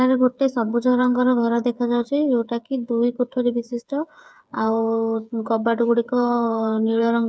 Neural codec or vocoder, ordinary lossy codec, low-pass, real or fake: codec, 16 kHz, 8 kbps, FreqCodec, smaller model; none; none; fake